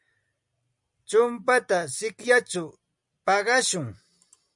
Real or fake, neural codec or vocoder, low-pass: real; none; 10.8 kHz